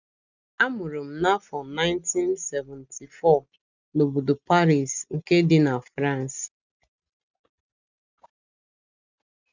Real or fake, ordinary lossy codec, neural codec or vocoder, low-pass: real; none; none; 7.2 kHz